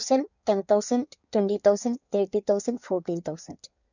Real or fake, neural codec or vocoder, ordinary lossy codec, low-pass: fake; codec, 16 kHz in and 24 kHz out, 1.1 kbps, FireRedTTS-2 codec; none; 7.2 kHz